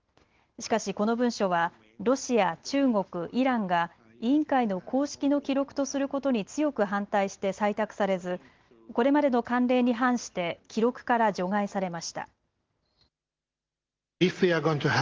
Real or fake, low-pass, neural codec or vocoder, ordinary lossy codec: real; 7.2 kHz; none; Opus, 16 kbps